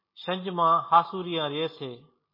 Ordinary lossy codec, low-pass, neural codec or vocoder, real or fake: MP3, 24 kbps; 5.4 kHz; none; real